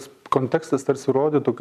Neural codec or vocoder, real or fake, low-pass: none; real; 14.4 kHz